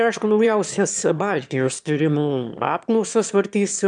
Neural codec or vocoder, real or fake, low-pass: autoencoder, 22.05 kHz, a latent of 192 numbers a frame, VITS, trained on one speaker; fake; 9.9 kHz